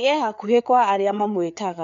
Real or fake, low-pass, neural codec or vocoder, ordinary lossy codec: fake; 7.2 kHz; codec, 16 kHz, 4 kbps, FreqCodec, larger model; MP3, 96 kbps